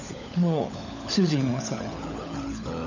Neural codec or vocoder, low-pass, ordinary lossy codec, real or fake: codec, 16 kHz, 16 kbps, FunCodec, trained on LibriTTS, 50 frames a second; 7.2 kHz; none; fake